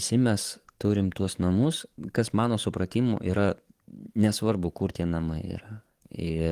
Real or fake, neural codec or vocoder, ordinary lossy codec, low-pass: fake; codec, 44.1 kHz, 7.8 kbps, DAC; Opus, 24 kbps; 14.4 kHz